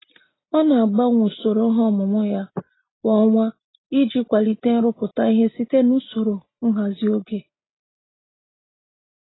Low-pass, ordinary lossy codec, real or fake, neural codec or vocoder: 7.2 kHz; AAC, 16 kbps; real; none